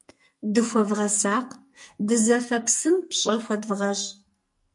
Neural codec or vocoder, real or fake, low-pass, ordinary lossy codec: codec, 44.1 kHz, 2.6 kbps, SNAC; fake; 10.8 kHz; MP3, 48 kbps